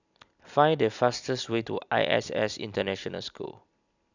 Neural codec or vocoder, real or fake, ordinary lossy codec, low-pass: none; real; none; 7.2 kHz